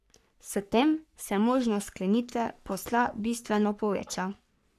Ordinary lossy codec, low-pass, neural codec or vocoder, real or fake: none; 14.4 kHz; codec, 44.1 kHz, 3.4 kbps, Pupu-Codec; fake